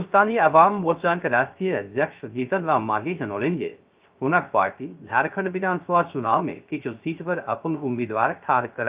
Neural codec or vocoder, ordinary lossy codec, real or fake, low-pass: codec, 16 kHz, 0.3 kbps, FocalCodec; Opus, 32 kbps; fake; 3.6 kHz